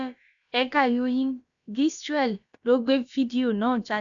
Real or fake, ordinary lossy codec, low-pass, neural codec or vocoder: fake; none; 7.2 kHz; codec, 16 kHz, about 1 kbps, DyCAST, with the encoder's durations